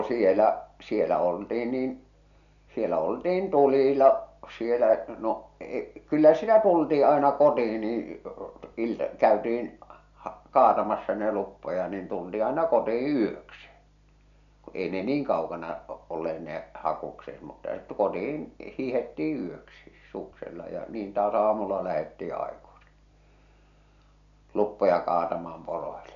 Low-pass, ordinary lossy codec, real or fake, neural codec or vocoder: 7.2 kHz; none; real; none